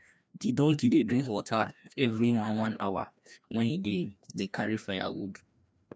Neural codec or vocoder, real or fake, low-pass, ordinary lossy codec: codec, 16 kHz, 1 kbps, FreqCodec, larger model; fake; none; none